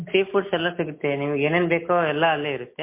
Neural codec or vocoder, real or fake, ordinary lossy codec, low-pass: none; real; MP3, 24 kbps; 3.6 kHz